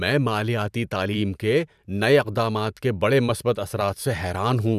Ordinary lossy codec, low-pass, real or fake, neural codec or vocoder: none; 14.4 kHz; fake; vocoder, 44.1 kHz, 128 mel bands every 512 samples, BigVGAN v2